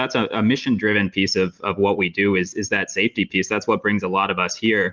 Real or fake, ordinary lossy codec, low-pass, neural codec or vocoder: real; Opus, 32 kbps; 7.2 kHz; none